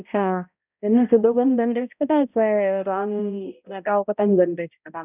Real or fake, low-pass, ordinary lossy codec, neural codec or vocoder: fake; 3.6 kHz; none; codec, 16 kHz, 0.5 kbps, X-Codec, HuBERT features, trained on balanced general audio